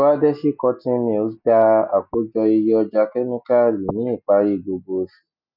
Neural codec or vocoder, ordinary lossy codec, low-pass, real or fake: none; none; 5.4 kHz; real